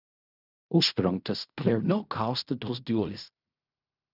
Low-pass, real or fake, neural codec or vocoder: 5.4 kHz; fake; codec, 16 kHz in and 24 kHz out, 0.4 kbps, LongCat-Audio-Codec, fine tuned four codebook decoder